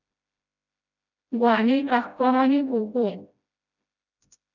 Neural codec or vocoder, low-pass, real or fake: codec, 16 kHz, 0.5 kbps, FreqCodec, smaller model; 7.2 kHz; fake